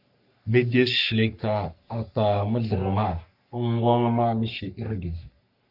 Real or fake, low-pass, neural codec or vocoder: fake; 5.4 kHz; codec, 44.1 kHz, 3.4 kbps, Pupu-Codec